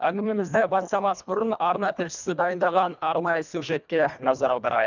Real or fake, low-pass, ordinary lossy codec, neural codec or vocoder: fake; 7.2 kHz; none; codec, 24 kHz, 1.5 kbps, HILCodec